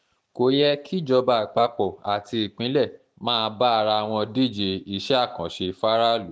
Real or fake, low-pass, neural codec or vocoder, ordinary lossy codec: real; none; none; none